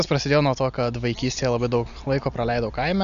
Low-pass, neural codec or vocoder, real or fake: 7.2 kHz; none; real